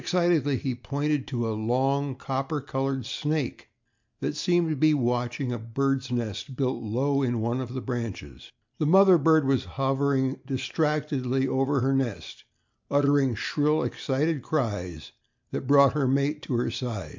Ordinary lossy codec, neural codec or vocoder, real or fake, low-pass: MP3, 64 kbps; none; real; 7.2 kHz